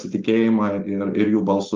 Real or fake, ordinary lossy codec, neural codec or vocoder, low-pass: real; Opus, 32 kbps; none; 7.2 kHz